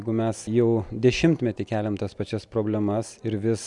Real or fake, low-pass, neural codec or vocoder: real; 10.8 kHz; none